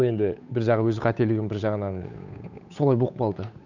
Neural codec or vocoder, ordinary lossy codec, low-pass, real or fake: codec, 16 kHz, 8 kbps, FunCodec, trained on Chinese and English, 25 frames a second; none; 7.2 kHz; fake